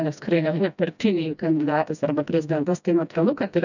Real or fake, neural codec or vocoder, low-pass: fake; codec, 16 kHz, 1 kbps, FreqCodec, smaller model; 7.2 kHz